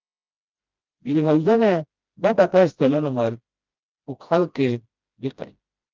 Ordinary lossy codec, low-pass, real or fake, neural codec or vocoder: Opus, 24 kbps; 7.2 kHz; fake; codec, 16 kHz, 1 kbps, FreqCodec, smaller model